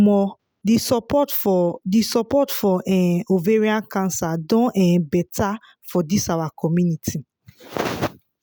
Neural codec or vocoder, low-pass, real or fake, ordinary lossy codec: none; none; real; none